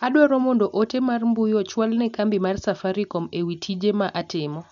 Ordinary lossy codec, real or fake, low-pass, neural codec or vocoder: none; real; 7.2 kHz; none